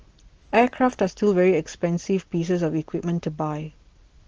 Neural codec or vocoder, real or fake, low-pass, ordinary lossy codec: none; real; 7.2 kHz; Opus, 16 kbps